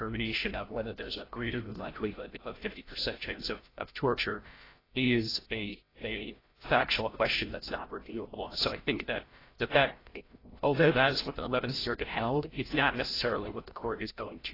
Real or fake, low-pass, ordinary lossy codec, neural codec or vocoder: fake; 5.4 kHz; AAC, 24 kbps; codec, 16 kHz, 0.5 kbps, FreqCodec, larger model